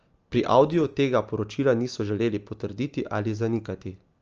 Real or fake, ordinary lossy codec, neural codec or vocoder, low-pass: real; Opus, 24 kbps; none; 7.2 kHz